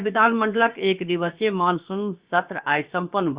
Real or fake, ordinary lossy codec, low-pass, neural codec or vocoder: fake; Opus, 64 kbps; 3.6 kHz; codec, 16 kHz, about 1 kbps, DyCAST, with the encoder's durations